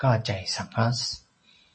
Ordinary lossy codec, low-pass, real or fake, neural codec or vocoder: MP3, 32 kbps; 10.8 kHz; real; none